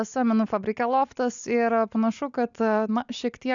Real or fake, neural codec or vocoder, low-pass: real; none; 7.2 kHz